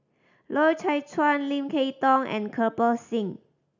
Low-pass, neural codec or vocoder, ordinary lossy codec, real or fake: 7.2 kHz; none; none; real